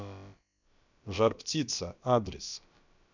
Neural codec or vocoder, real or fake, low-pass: codec, 16 kHz, about 1 kbps, DyCAST, with the encoder's durations; fake; 7.2 kHz